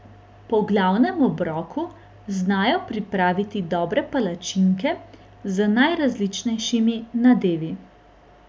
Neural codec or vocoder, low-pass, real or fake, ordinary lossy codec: none; none; real; none